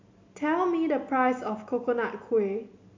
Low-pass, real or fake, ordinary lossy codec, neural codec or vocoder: 7.2 kHz; real; MP3, 48 kbps; none